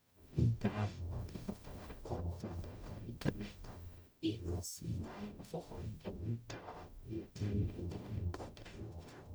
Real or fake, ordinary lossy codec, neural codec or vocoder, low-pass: fake; none; codec, 44.1 kHz, 0.9 kbps, DAC; none